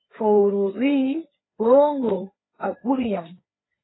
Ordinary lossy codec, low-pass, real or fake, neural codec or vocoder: AAC, 16 kbps; 7.2 kHz; fake; codec, 16 kHz, 4 kbps, FreqCodec, larger model